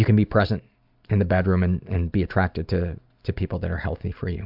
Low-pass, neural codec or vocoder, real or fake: 5.4 kHz; none; real